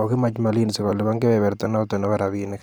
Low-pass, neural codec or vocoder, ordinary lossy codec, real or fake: none; vocoder, 44.1 kHz, 128 mel bands every 512 samples, BigVGAN v2; none; fake